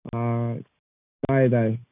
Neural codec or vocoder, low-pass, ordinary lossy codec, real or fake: none; 3.6 kHz; none; real